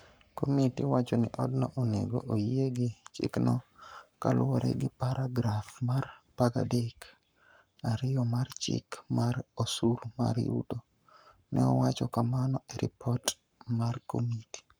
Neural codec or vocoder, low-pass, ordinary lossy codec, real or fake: codec, 44.1 kHz, 7.8 kbps, Pupu-Codec; none; none; fake